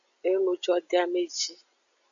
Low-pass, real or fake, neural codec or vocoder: 7.2 kHz; real; none